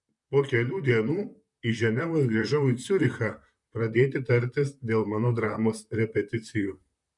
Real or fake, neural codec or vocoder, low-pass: fake; vocoder, 44.1 kHz, 128 mel bands, Pupu-Vocoder; 10.8 kHz